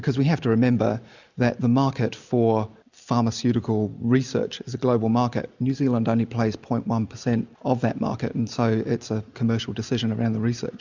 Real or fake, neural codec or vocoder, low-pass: real; none; 7.2 kHz